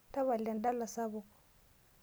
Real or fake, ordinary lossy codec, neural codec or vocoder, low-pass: real; none; none; none